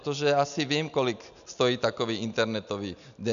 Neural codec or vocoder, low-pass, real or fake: none; 7.2 kHz; real